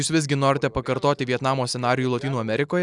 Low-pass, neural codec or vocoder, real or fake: 10.8 kHz; none; real